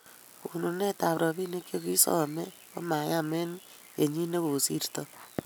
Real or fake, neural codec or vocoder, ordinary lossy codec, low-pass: real; none; none; none